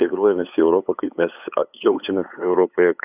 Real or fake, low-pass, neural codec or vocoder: fake; 3.6 kHz; codec, 16 kHz, 8 kbps, FunCodec, trained on LibriTTS, 25 frames a second